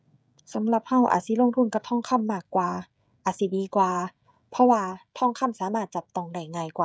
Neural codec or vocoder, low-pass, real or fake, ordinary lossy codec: codec, 16 kHz, 16 kbps, FreqCodec, smaller model; none; fake; none